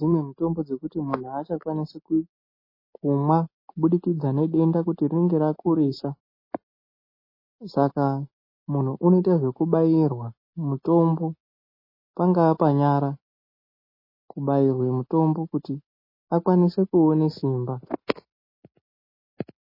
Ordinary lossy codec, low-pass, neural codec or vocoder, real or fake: MP3, 24 kbps; 5.4 kHz; none; real